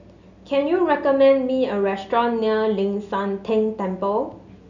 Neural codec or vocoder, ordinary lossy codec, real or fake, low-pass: none; none; real; 7.2 kHz